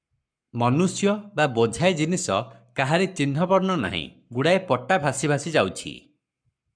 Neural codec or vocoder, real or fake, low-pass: codec, 44.1 kHz, 7.8 kbps, Pupu-Codec; fake; 9.9 kHz